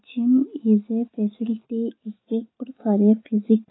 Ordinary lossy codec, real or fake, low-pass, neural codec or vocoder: AAC, 16 kbps; real; 7.2 kHz; none